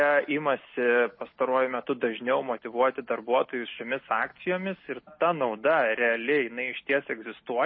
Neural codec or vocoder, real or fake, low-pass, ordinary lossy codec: none; real; 7.2 kHz; MP3, 24 kbps